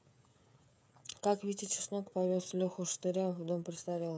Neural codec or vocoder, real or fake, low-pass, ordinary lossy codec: codec, 16 kHz, 16 kbps, FreqCodec, smaller model; fake; none; none